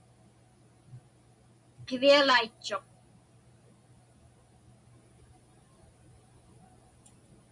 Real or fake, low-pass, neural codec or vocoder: real; 10.8 kHz; none